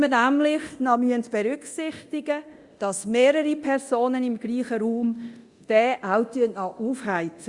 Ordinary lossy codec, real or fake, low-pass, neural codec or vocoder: Opus, 64 kbps; fake; 10.8 kHz; codec, 24 kHz, 0.9 kbps, DualCodec